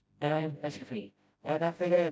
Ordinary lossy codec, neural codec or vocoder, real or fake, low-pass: none; codec, 16 kHz, 0.5 kbps, FreqCodec, smaller model; fake; none